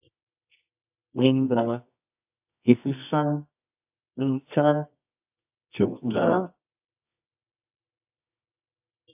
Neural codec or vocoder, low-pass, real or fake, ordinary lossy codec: codec, 24 kHz, 0.9 kbps, WavTokenizer, medium music audio release; 3.6 kHz; fake; AAC, 32 kbps